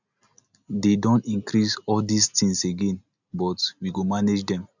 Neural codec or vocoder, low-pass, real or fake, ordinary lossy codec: none; 7.2 kHz; real; none